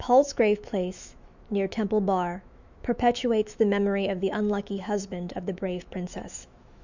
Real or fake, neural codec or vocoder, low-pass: fake; autoencoder, 48 kHz, 128 numbers a frame, DAC-VAE, trained on Japanese speech; 7.2 kHz